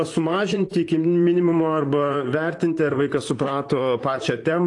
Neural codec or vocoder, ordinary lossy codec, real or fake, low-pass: vocoder, 44.1 kHz, 128 mel bands, Pupu-Vocoder; AAC, 48 kbps; fake; 10.8 kHz